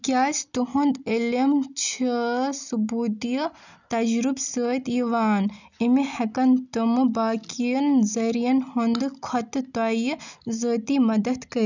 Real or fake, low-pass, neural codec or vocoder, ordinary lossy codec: real; 7.2 kHz; none; none